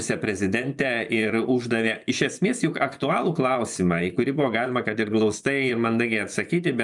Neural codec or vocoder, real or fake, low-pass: vocoder, 24 kHz, 100 mel bands, Vocos; fake; 10.8 kHz